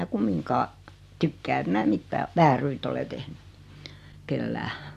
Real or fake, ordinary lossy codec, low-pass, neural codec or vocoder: real; MP3, 96 kbps; 14.4 kHz; none